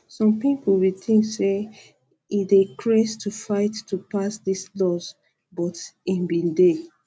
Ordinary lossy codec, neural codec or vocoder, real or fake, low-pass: none; none; real; none